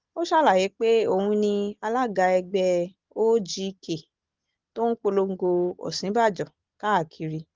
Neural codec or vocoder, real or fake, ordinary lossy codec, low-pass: none; real; Opus, 16 kbps; 7.2 kHz